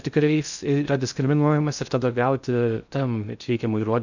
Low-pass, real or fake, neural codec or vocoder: 7.2 kHz; fake; codec, 16 kHz in and 24 kHz out, 0.6 kbps, FocalCodec, streaming, 2048 codes